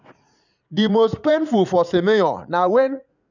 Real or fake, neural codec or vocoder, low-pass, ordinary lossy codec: fake; vocoder, 44.1 kHz, 80 mel bands, Vocos; 7.2 kHz; none